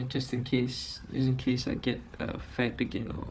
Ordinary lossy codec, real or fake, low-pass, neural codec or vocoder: none; fake; none; codec, 16 kHz, 4 kbps, FunCodec, trained on Chinese and English, 50 frames a second